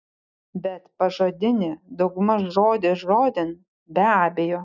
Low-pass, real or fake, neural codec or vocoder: 7.2 kHz; real; none